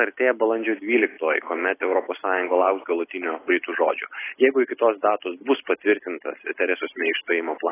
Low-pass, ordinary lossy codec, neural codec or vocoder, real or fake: 3.6 kHz; AAC, 16 kbps; none; real